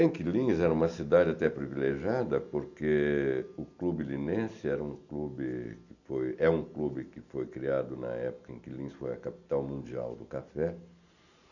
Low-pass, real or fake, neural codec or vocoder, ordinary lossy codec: 7.2 kHz; real; none; none